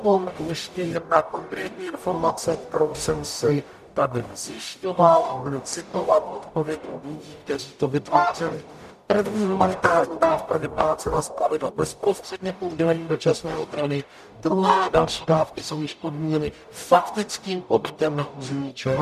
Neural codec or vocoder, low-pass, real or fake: codec, 44.1 kHz, 0.9 kbps, DAC; 14.4 kHz; fake